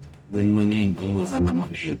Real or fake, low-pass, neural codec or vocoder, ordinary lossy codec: fake; 19.8 kHz; codec, 44.1 kHz, 0.9 kbps, DAC; none